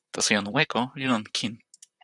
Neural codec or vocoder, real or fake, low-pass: vocoder, 44.1 kHz, 128 mel bands, Pupu-Vocoder; fake; 10.8 kHz